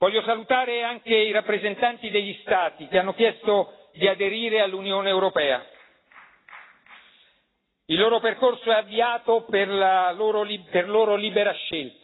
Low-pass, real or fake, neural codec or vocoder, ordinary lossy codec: 7.2 kHz; real; none; AAC, 16 kbps